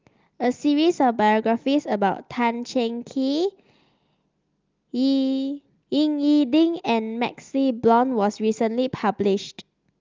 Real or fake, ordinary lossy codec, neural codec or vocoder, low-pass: real; Opus, 32 kbps; none; 7.2 kHz